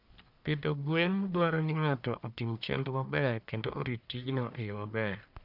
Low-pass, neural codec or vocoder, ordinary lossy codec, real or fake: 5.4 kHz; codec, 44.1 kHz, 1.7 kbps, Pupu-Codec; none; fake